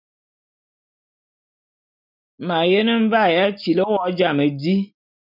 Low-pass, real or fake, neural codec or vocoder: 5.4 kHz; real; none